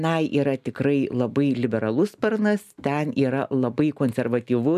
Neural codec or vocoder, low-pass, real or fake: none; 14.4 kHz; real